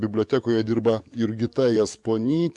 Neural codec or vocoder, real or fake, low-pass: codec, 44.1 kHz, 7.8 kbps, DAC; fake; 10.8 kHz